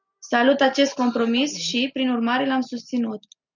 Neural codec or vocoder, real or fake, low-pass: none; real; 7.2 kHz